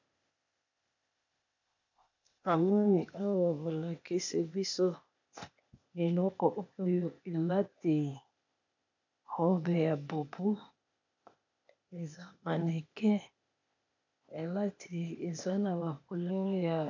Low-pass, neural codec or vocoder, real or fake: 7.2 kHz; codec, 16 kHz, 0.8 kbps, ZipCodec; fake